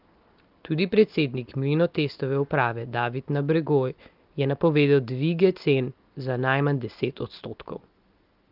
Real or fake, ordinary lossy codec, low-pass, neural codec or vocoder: real; Opus, 32 kbps; 5.4 kHz; none